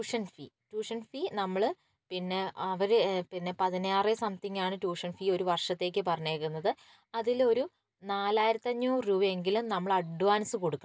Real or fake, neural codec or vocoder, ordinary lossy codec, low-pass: real; none; none; none